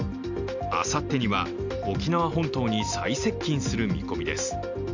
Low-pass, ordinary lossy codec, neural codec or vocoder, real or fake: 7.2 kHz; none; none; real